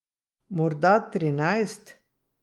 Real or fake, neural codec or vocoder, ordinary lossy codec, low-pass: fake; autoencoder, 48 kHz, 128 numbers a frame, DAC-VAE, trained on Japanese speech; Opus, 32 kbps; 19.8 kHz